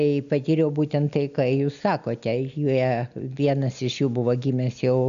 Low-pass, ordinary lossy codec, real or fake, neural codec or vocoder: 7.2 kHz; MP3, 96 kbps; real; none